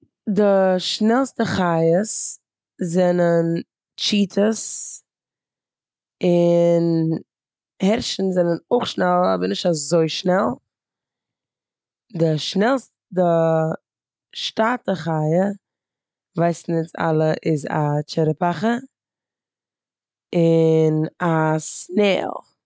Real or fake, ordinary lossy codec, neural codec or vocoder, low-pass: real; none; none; none